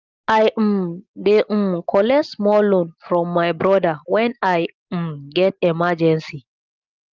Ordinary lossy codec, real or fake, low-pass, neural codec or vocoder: Opus, 32 kbps; real; 7.2 kHz; none